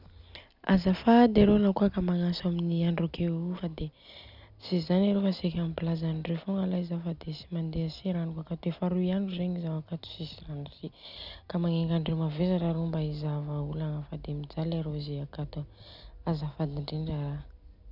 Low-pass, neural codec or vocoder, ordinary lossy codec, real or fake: 5.4 kHz; none; none; real